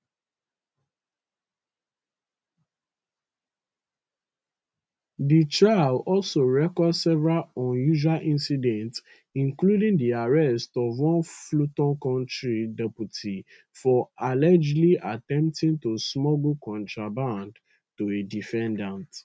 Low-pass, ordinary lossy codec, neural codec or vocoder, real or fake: none; none; none; real